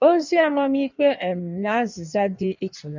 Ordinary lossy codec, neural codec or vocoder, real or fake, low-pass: none; codec, 16 kHz in and 24 kHz out, 1.1 kbps, FireRedTTS-2 codec; fake; 7.2 kHz